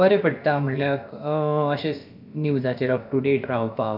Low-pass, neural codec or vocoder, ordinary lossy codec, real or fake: 5.4 kHz; codec, 16 kHz, about 1 kbps, DyCAST, with the encoder's durations; none; fake